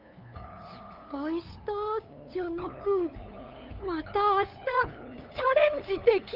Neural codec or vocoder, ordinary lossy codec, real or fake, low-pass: codec, 16 kHz, 8 kbps, FunCodec, trained on LibriTTS, 25 frames a second; Opus, 24 kbps; fake; 5.4 kHz